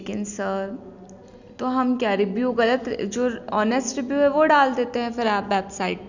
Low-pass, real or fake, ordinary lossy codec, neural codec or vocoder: 7.2 kHz; real; none; none